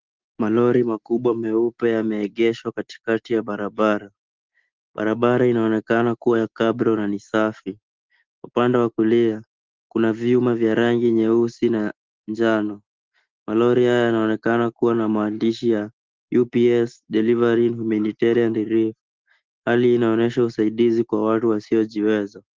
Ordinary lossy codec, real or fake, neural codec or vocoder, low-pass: Opus, 16 kbps; real; none; 7.2 kHz